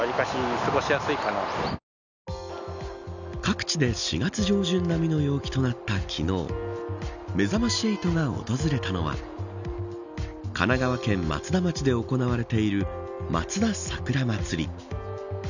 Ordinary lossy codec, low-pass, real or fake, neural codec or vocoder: none; 7.2 kHz; real; none